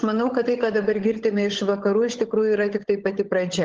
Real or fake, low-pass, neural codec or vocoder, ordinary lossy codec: fake; 7.2 kHz; codec, 16 kHz, 16 kbps, FunCodec, trained on LibriTTS, 50 frames a second; Opus, 16 kbps